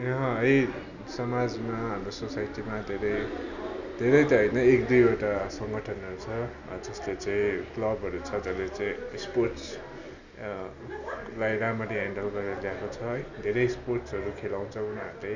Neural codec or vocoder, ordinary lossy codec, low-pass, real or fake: none; none; 7.2 kHz; real